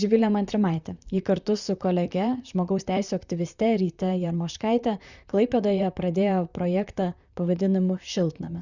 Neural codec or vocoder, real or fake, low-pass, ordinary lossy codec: vocoder, 44.1 kHz, 128 mel bands, Pupu-Vocoder; fake; 7.2 kHz; Opus, 64 kbps